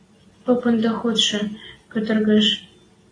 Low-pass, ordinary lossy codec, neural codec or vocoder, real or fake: 9.9 kHz; AAC, 32 kbps; none; real